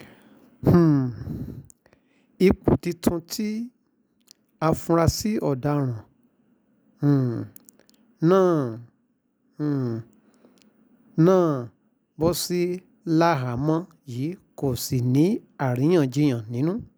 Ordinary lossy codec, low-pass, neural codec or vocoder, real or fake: none; none; none; real